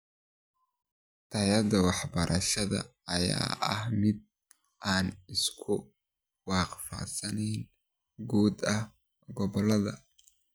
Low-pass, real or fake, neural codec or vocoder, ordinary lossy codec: none; real; none; none